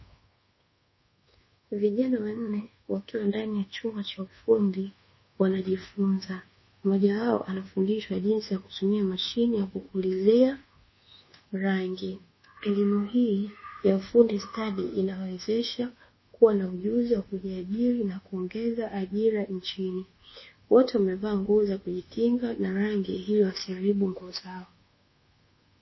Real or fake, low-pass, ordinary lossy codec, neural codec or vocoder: fake; 7.2 kHz; MP3, 24 kbps; codec, 24 kHz, 1.2 kbps, DualCodec